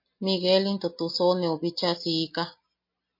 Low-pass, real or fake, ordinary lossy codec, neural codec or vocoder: 5.4 kHz; real; MP3, 32 kbps; none